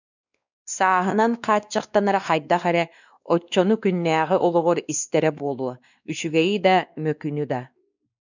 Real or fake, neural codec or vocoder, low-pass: fake; codec, 16 kHz, 2 kbps, X-Codec, WavLM features, trained on Multilingual LibriSpeech; 7.2 kHz